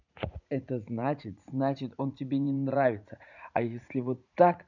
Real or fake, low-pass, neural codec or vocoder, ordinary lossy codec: fake; 7.2 kHz; vocoder, 44.1 kHz, 80 mel bands, Vocos; none